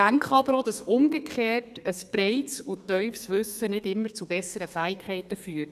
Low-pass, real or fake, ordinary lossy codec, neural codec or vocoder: 14.4 kHz; fake; none; codec, 32 kHz, 1.9 kbps, SNAC